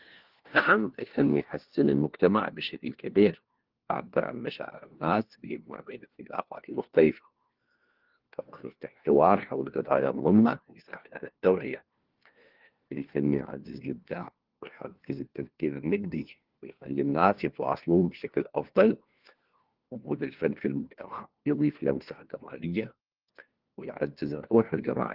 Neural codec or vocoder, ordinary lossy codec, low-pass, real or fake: codec, 16 kHz, 1 kbps, FunCodec, trained on LibriTTS, 50 frames a second; Opus, 16 kbps; 5.4 kHz; fake